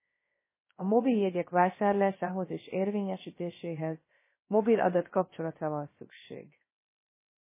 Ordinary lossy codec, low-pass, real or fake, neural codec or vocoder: MP3, 16 kbps; 3.6 kHz; fake; codec, 16 kHz, 0.3 kbps, FocalCodec